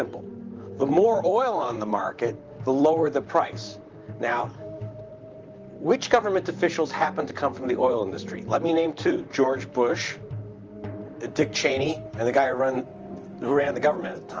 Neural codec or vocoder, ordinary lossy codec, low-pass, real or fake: vocoder, 44.1 kHz, 128 mel bands, Pupu-Vocoder; Opus, 24 kbps; 7.2 kHz; fake